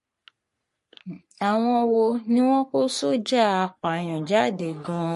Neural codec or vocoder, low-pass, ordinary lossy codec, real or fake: codec, 44.1 kHz, 3.4 kbps, Pupu-Codec; 14.4 kHz; MP3, 48 kbps; fake